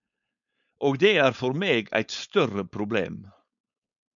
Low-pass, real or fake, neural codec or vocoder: 7.2 kHz; fake; codec, 16 kHz, 4.8 kbps, FACodec